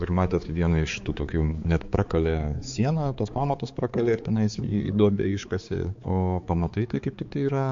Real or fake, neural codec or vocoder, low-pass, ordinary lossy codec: fake; codec, 16 kHz, 4 kbps, X-Codec, HuBERT features, trained on balanced general audio; 7.2 kHz; MP3, 48 kbps